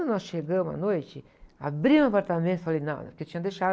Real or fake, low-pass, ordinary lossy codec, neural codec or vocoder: real; none; none; none